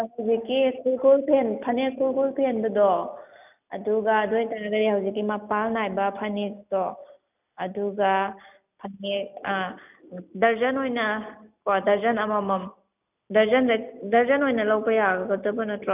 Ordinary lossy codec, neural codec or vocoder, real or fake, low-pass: none; none; real; 3.6 kHz